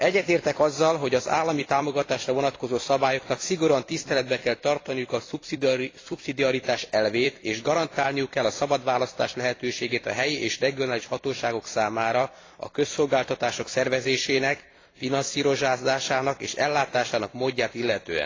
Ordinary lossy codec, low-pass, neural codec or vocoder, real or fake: AAC, 32 kbps; 7.2 kHz; vocoder, 44.1 kHz, 128 mel bands every 256 samples, BigVGAN v2; fake